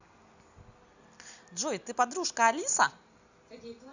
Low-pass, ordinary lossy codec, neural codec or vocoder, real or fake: 7.2 kHz; none; none; real